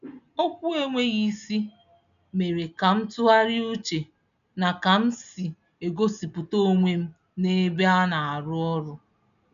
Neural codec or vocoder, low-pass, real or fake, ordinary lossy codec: none; 7.2 kHz; real; none